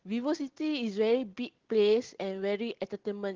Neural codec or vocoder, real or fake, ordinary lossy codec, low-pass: none; real; Opus, 16 kbps; 7.2 kHz